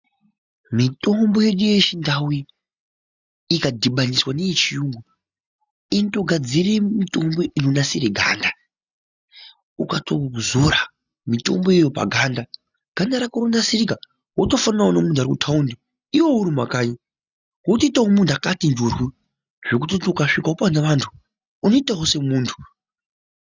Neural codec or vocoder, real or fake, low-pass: none; real; 7.2 kHz